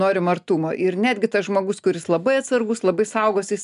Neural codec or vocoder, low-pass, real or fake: none; 10.8 kHz; real